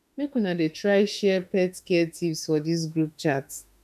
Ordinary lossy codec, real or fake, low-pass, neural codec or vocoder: none; fake; 14.4 kHz; autoencoder, 48 kHz, 32 numbers a frame, DAC-VAE, trained on Japanese speech